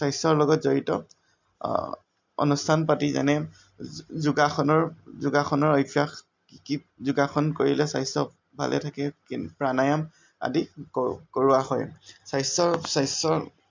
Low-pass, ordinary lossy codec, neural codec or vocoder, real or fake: 7.2 kHz; MP3, 64 kbps; none; real